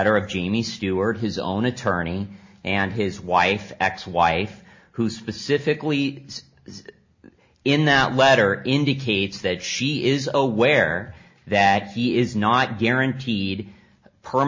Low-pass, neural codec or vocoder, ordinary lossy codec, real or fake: 7.2 kHz; none; MP3, 32 kbps; real